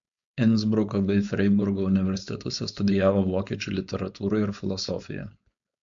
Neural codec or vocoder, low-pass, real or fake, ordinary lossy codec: codec, 16 kHz, 4.8 kbps, FACodec; 7.2 kHz; fake; MP3, 64 kbps